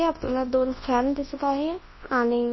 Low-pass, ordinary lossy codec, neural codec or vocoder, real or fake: 7.2 kHz; MP3, 24 kbps; codec, 24 kHz, 0.9 kbps, WavTokenizer, large speech release; fake